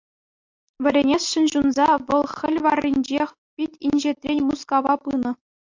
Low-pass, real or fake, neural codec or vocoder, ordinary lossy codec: 7.2 kHz; real; none; MP3, 48 kbps